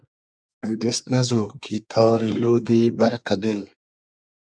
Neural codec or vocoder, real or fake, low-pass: codec, 24 kHz, 1 kbps, SNAC; fake; 9.9 kHz